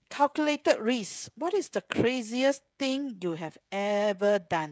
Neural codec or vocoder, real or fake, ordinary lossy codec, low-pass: codec, 16 kHz, 16 kbps, FreqCodec, smaller model; fake; none; none